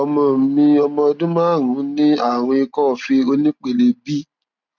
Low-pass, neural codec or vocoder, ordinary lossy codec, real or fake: 7.2 kHz; none; none; real